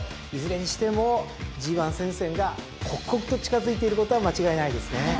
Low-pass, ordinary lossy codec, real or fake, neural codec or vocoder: none; none; real; none